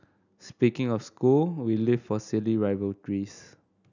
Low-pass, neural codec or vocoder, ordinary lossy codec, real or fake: 7.2 kHz; none; none; real